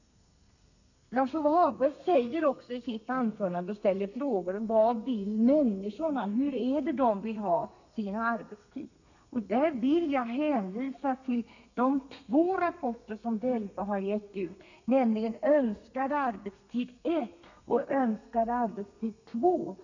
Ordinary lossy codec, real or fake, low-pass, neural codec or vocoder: none; fake; 7.2 kHz; codec, 32 kHz, 1.9 kbps, SNAC